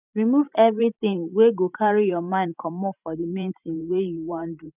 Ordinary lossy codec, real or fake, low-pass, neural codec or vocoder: none; fake; 3.6 kHz; vocoder, 44.1 kHz, 128 mel bands every 512 samples, BigVGAN v2